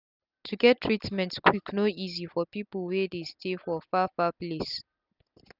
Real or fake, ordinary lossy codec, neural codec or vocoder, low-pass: real; none; none; 5.4 kHz